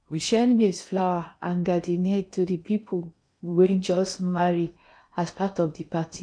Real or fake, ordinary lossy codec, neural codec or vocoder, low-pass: fake; none; codec, 16 kHz in and 24 kHz out, 0.8 kbps, FocalCodec, streaming, 65536 codes; 9.9 kHz